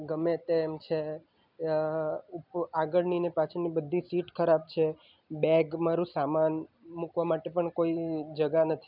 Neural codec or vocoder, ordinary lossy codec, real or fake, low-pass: none; none; real; 5.4 kHz